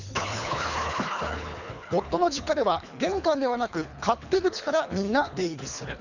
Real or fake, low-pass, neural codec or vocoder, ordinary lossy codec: fake; 7.2 kHz; codec, 24 kHz, 3 kbps, HILCodec; none